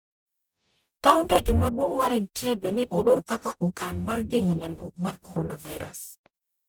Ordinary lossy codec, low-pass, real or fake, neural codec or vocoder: none; none; fake; codec, 44.1 kHz, 0.9 kbps, DAC